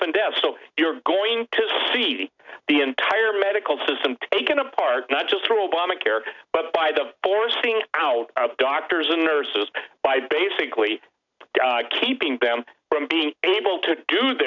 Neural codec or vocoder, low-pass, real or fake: none; 7.2 kHz; real